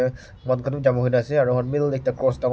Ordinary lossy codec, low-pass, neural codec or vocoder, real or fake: none; none; none; real